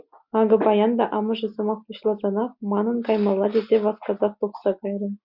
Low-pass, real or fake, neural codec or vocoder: 5.4 kHz; real; none